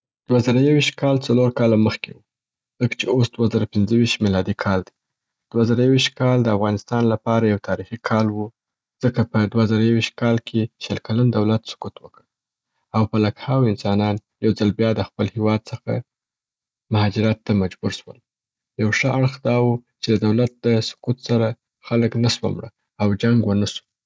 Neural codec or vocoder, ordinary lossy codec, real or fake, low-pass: none; none; real; none